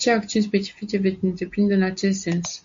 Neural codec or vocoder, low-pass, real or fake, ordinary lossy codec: none; 7.2 kHz; real; MP3, 48 kbps